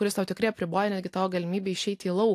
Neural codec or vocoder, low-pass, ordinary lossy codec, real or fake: none; 14.4 kHz; AAC, 64 kbps; real